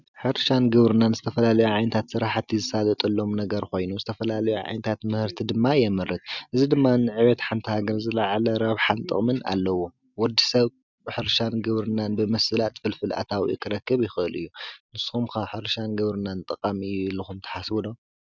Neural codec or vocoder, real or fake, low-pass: none; real; 7.2 kHz